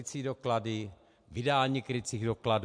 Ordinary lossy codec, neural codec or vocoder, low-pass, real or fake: MP3, 64 kbps; none; 9.9 kHz; real